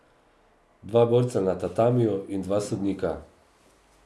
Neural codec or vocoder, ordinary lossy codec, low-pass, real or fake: none; none; none; real